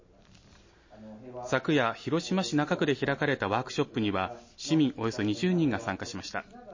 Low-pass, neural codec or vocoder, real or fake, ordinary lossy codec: 7.2 kHz; none; real; MP3, 32 kbps